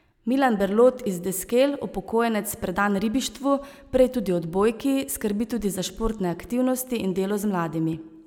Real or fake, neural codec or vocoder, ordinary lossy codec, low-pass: real; none; none; 19.8 kHz